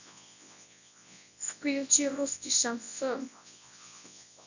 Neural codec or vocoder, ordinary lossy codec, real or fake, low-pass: codec, 24 kHz, 0.9 kbps, WavTokenizer, large speech release; none; fake; 7.2 kHz